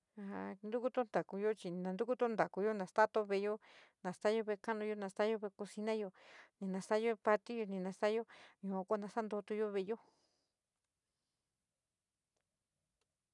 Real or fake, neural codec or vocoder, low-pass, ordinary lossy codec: real; none; 10.8 kHz; none